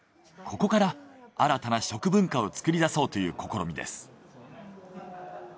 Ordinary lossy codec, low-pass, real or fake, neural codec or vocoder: none; none; real; none